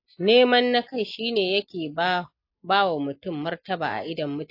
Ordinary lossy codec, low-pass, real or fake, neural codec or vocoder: AAC, 32 kbps; 5.4 kHz; real; none